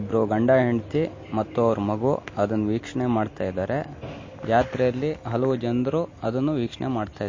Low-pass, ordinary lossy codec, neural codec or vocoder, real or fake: 7.2 kHz; MP3, 32 kbps; none; real